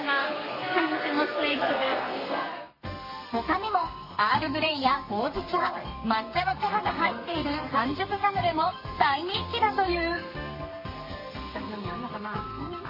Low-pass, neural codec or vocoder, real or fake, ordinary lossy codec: 5.4 kHz; codec, 32 kHz, 1.9 kbps, SNAC; fake; MP3, 24 kbps